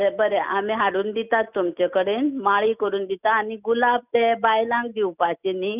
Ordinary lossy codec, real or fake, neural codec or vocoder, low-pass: none; real; none; 3.6 kHz